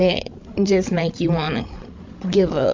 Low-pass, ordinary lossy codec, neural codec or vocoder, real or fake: 7.2 kHz; MP3, 48 kbps; codec, 16 kHz, 16 kbps, FreqCodec, larger model; fake